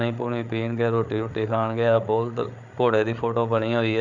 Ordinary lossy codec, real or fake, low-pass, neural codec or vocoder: none; fake; 7.2 kHz; codec, 16 kHz, 8 kbps, FreqCodec, larger model